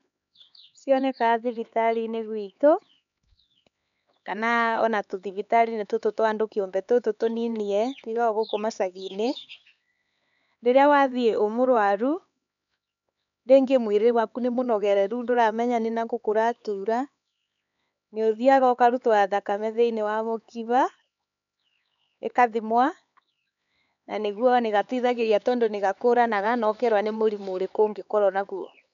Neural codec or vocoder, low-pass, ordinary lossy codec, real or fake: codec, 16 kHz, 4 kbps, X-Codec, HuBERT features, trained on LibriSpeech; 7.2 kHz; none; fake